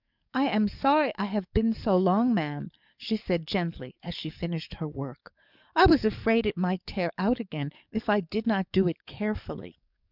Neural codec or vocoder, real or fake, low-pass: codec, 44.1 kHz, 7.8 kbps, DAC; fake; 5.4 kHz